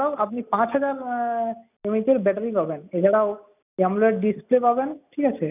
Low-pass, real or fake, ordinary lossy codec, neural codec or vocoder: 3.6 kHz; real; none; none